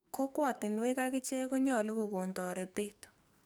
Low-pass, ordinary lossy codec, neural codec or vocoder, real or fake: none; none; codec, 44.1 kHz, 2.6 kbps, SNAC; fake